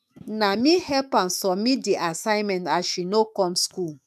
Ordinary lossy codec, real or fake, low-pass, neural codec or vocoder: none; fake; 14.4 kHz; autoencoder, 48 kHz, 128 numbers a frame, DAC-VAE, trained on Japanese speech